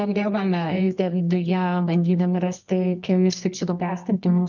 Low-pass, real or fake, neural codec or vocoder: 7.2 kHz; fake; codec, 24 kHz, 0.9 kbps, WavTokenizer, medium music audio release